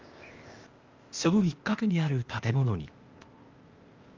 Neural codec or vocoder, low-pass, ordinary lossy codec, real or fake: codec, 16 kHz, 0.8 kbps, ZipCodec; 7.2 kHz; Opus, 32 kbps; fake